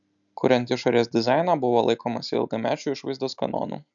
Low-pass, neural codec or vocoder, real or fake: 7.2 kHz; none; real